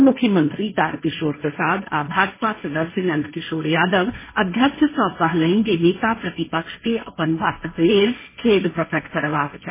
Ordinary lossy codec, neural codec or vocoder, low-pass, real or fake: MP3, 16 kbps; codec, 16 kHz, 1.1 kbps, Voila-Tokenizer; 3.6 kHz; fake